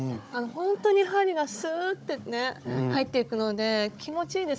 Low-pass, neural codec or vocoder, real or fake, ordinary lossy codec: none; codec, 16 kHz, 16 kbps, FunCodec, trained on Chinese and English, 50 frames a second; fake; none